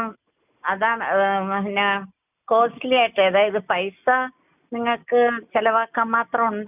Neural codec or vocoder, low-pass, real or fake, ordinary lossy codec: none; 3.6 kHz; real; none